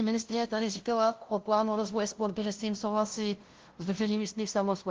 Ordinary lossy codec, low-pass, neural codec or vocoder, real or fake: Opus, 16 kbps; 7.2 kHz; codec, 16 kHz, 0.5 kbps, FunCodec, trained on LibriTTS, 25 frames a second; fake